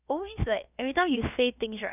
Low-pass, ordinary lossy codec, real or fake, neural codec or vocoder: 3.6 kHz; none; fake; codec, 16 kHz, about 1 kbps, DyCAST, with the encoder's durations